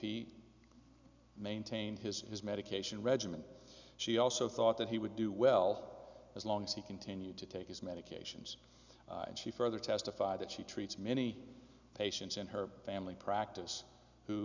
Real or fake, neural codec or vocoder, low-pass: real; none; 7.2 kHz